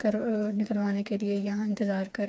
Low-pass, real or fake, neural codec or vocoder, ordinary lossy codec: none; fake; codec, 16 kHz, 4 kbps, FreqCodec, smaller model; none